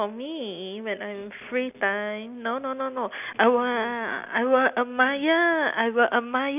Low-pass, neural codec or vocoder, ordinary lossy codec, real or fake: 3.6 kHz; none; none; real